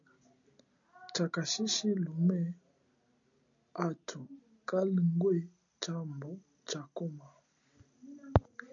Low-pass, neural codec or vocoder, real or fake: 7.2 kHz; none; real